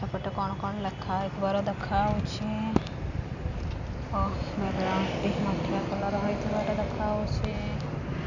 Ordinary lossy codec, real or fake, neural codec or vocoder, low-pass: none; real; none; 7.2 kHz